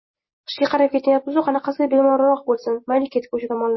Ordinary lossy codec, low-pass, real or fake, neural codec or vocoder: MP3, 24 kbps; 7.2 kHz; real; none